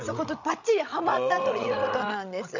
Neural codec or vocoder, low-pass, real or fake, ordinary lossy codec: codec, 16 kHz, 16 kbps, FreqCodec, larger model; 7.2 kHz; fake; none